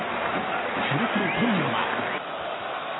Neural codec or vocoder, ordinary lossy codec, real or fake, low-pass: none; AAC, 16 kbps; real; 7.2 kHz